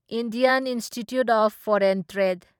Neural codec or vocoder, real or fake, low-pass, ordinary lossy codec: vocoder, 44.1 kHz, 128 mel bands every 512 samples, BigVGAN v2; fake; 19.8 kHz; none